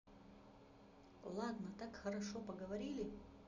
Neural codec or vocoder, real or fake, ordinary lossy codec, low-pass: none; real; none; 7.2 kHz